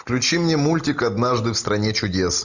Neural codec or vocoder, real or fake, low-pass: none; real; 7.2 kHz